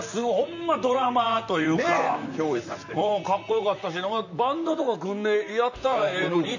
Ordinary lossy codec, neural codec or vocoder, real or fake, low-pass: none; vocoder, 44.1 kHz, 128 mel bands, Pupu-Vocoder; fake; 7.2 kHz